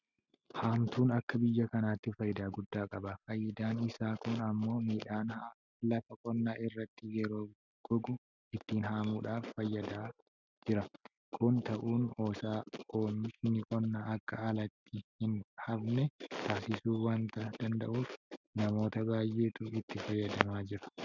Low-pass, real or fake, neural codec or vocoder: 7.2 kHz; real; none